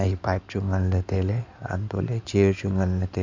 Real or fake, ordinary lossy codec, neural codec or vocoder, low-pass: fake; MP3, 64 kbps; codec, 16 kHz in and 24 kHz out, 2.2 kbps, FireRedTTS-2 codec; 7.2 kHz